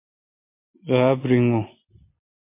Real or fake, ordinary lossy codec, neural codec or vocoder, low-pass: real; MP3, 24 kbps; none; 3.6 kHz